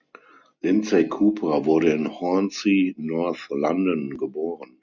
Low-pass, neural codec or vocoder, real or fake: 7.2 kHz; none; real